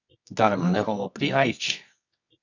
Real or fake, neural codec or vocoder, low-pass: fake; codec, 24 kHz, 0.9 kbps, WavTokenizer, medium music audio release; 7.2 kHz